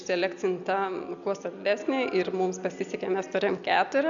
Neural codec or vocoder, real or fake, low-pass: none; real; 7.2 kHz